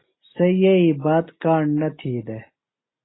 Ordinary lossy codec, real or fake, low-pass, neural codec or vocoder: AAC, 16 kbps; real; 7.2 kHz; none